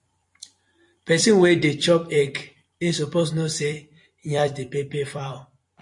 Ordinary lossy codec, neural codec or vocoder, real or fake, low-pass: MP3, 48 kbps; vocoder, 44.1 kHz, 128 mel bands every 256 samples, BigVGAN v2; fake; 10.8 kHz